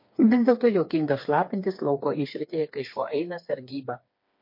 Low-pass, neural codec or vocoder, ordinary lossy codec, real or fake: 5.4 kHz; codec, 16 kHz, 4 kbps, FreqCodec, smaller model; MP3, 32 kbps; fake